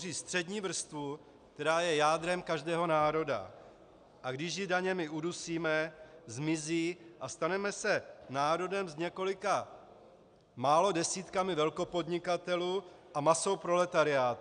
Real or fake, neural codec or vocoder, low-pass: real; none; 9.9 kHz